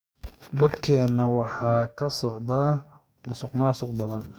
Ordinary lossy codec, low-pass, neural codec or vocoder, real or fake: none; none; codec, 44.1 kHz, 2.6 kbps, DAC; fake